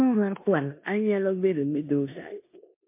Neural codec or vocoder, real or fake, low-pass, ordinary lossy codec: codec, 16 kHz in and 24 kHz out, 0.9 kbps, LongCat-Audio-Codec, four codebook decoder; fake; 3.6 kHz; MP3, 24 kbps